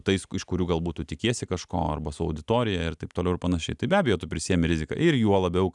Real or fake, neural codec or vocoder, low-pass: real; none; 10.8 kHz